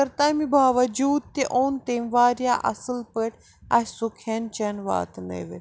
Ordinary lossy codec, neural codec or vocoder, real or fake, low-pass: none; none; real; none